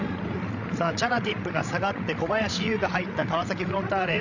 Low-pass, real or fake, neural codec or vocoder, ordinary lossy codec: 7.2 kHz; fake; codec, 16 kHz, 16 kbps, FreqCodec, larger model; none